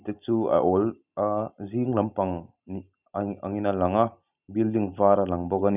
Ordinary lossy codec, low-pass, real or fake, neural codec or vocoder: none; 3.6 kHz; real; none